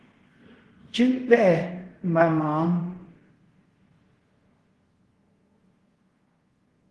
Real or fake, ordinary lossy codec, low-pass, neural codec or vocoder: fake; Opus, 16 kbps; 10.8 kHz; codec, 24 kHz, 0.5 kbps, DualCodec